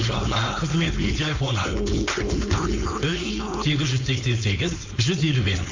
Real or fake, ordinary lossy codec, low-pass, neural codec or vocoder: fake; MP3, 48 kbps; 7.2 kHz; codec, 16 kHz, 4.8 kbps, FACodec